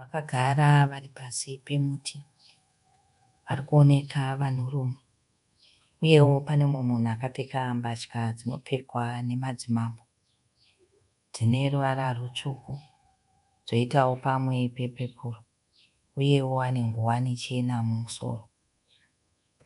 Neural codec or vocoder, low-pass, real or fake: codec, 24 kHz, 1.2 kbps, DualCodec; 10.8 kHz; fake